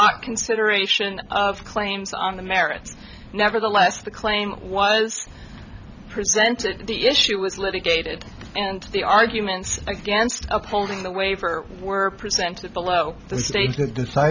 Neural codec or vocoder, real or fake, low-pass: none; real; 7.2 kHz